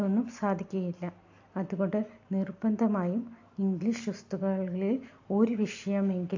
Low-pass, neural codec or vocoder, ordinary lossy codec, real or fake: 7.2 kHz; none; AAC, 48 kbps; real